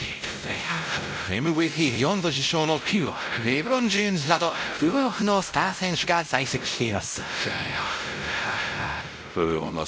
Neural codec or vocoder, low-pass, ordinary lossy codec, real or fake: codec, 16 kHz, 0.5 kbps, X-Codec, WavLM features, trained on Multilingual LibriSpeech; none; none; fake